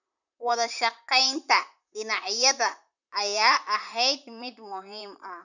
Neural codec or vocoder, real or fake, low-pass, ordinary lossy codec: vocoder, 44.1 kHz, 128 mel bands, Pupu-Vocoder; fake; 7.2 kHz; none